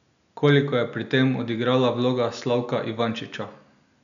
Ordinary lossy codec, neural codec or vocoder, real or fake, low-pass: none; none; real; 7.2 kHz